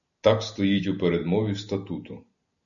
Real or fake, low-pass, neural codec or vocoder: real; 7.2 kHz; none